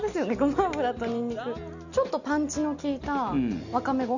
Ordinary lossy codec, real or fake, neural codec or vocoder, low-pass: none; real; none; 7.2 kHz